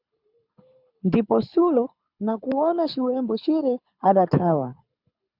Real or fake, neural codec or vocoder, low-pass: fake; codec, 24 kHz, 6 kbps, HILCodec; 5.4 kHz